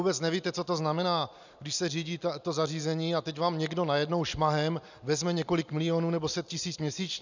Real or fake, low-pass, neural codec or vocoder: real; 7.2 kHz; none